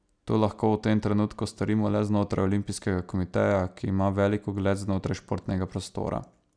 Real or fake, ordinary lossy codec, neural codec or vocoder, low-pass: real; none; none; 9.9 kHz